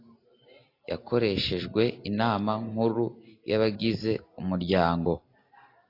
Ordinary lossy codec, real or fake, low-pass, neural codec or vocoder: AAC, 32 kbps; real; 5.4 kHz; none